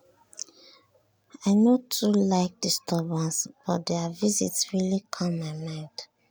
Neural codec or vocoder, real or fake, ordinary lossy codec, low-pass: none; real; none; none